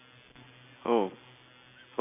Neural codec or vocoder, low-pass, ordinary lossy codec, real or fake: none; 3.6 kHz; none; real